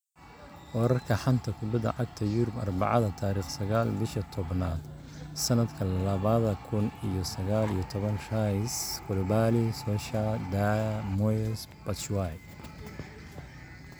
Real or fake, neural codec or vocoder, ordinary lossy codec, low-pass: real; none; none; none